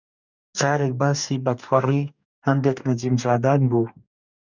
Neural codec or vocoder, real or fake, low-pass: codec, 44.1 kHz, 2.6 kbps, DAC; fake; 7.2 kHz